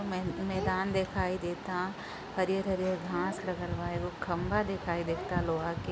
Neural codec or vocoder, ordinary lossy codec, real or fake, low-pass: none; none; real; none